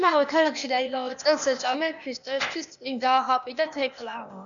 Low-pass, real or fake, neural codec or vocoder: 7.2 kHz; fake; codec, 16 kHz, 0.8 kbps, ZipCodec